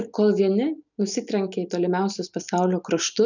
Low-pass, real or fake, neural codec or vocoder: 7.2 kHz; real; none